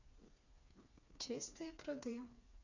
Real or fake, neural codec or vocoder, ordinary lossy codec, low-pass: fake; codec, 16 kHz, 4 kbps, FreqCodec, smaller model; none; 7.2 kHz